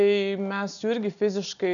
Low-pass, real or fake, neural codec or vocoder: 7.2 kHz; real; none